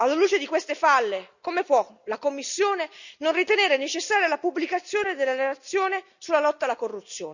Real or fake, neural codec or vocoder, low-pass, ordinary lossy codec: real; none; 7.2 kHz; none